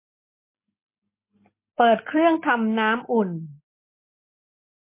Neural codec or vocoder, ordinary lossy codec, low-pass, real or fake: none; MP3, 24 kbps; 3.6 kHz; real